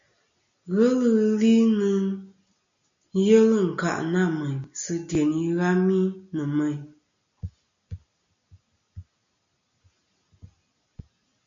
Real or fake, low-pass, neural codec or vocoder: real; 7.2 kHz; none